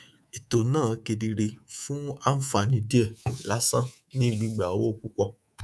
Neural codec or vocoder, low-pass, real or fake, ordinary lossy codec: codec, 24 kHz, 3.1 kbps, DualCodec; none; fake; none